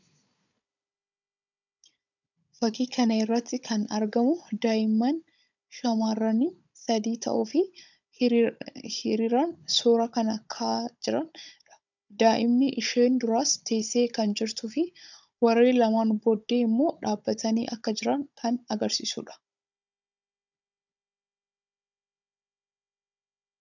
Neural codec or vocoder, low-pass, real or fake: codec, 16 kHz, 16 kbps, FunCodec, trained on Chinese and English, 50 frames a second; 7.2 kHz; fake